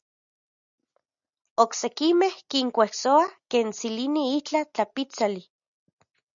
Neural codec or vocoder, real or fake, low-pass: none; real; 7.2 kHz